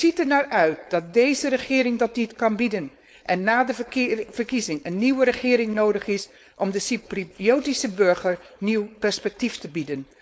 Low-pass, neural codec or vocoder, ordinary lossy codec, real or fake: none; codec, 16 kHz, 4.8 kbps, FACodec; none; fake